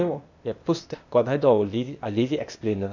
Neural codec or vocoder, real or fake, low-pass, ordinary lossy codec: codec, 16 kHz, 0.8 kbps, ZipCodec; fake; 7.2 kHz; none